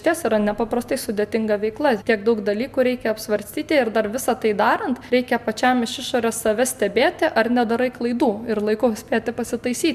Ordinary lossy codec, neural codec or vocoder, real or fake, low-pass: MP3, 96 kbps; none; real; 14.4 kHz